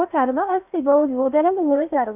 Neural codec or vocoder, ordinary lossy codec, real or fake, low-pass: codec, 16 kHz in and 24 kHz out, 0.8 kbps, FocalCodec, streaming, 65536 codes; none; fake; 3.6 kHz